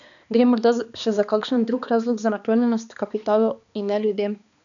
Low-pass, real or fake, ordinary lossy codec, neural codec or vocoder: 7.2 kHz; fake; none; codec, 16 kHz, 2 kbps, X-Codec, HuBERT features, trained on balanced general audio